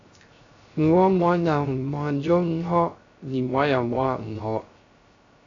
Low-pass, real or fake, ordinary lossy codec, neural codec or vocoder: 7.2 kHz; fake; AAC, 48 kbps; codec, 16 kHz, 0.3 kbps, FocalCodec